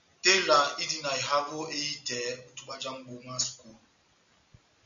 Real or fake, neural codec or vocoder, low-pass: real; none; 7.2 kHz